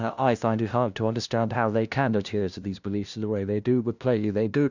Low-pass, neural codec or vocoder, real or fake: 7.2 kHz; codec, 16 kHz, 0.5 kbps, FunCodec, trained on LibriTTS, 25 frames a second; fake